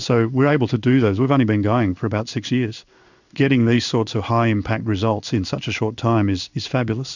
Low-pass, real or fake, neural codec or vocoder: 7.2 kHz; fake; codec, 16 kHz in and 24 kHz out, 1 kbps, XY-Tokenizer